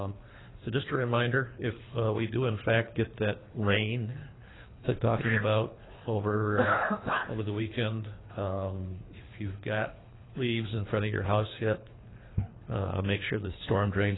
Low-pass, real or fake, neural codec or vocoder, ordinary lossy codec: 7.2 kHz; fake; codec, 24 kHz, 3 kbps, HILCodec; AAC, 16 kbps